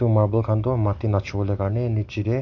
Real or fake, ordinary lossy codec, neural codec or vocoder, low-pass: real; none; none; 7.2 kHz